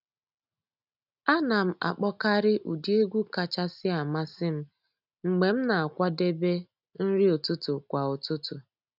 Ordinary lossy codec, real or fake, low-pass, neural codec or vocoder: none; real; 5.4 kHz; none